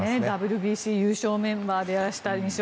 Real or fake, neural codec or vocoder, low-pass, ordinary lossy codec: real; none; none; none